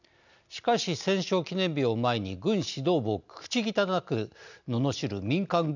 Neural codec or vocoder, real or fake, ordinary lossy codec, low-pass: none; real; none; 7.2 kHz